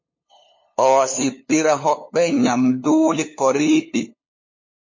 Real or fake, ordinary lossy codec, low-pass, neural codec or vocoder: fake; MP3, 32 kbps; 7.2 kHz; codec, 16 kHz, 2 kbps, FunCodec, trained on LibriTTS, 25 frames a second